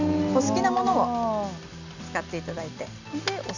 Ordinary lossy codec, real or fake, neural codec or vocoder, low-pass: none; real; none; 7.2 kHz